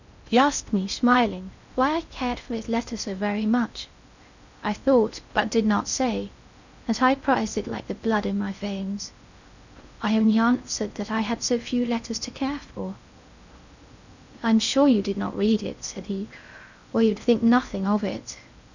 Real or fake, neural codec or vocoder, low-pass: fake; codec, 16 kHz in and 24 kHz out, 0.6 kbps, FocalCodec, streaming, 4096 codes; 7.2 kHz